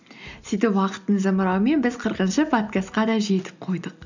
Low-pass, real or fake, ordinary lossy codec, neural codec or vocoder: 7.2 kHz; real; none; none